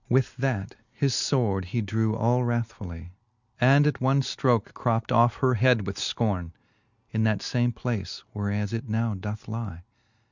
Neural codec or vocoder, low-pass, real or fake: none; 7.2 kHz; real